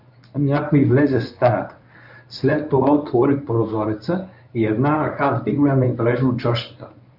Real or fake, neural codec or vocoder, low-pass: fake; codec, 24 kHz, 0.9 kbps, WavTokenizer, medium speech release version 1; 5.4 kHz